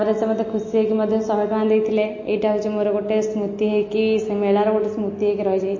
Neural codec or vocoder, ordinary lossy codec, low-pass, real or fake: none; MP3, 32 kbps; 7.2 kHz; real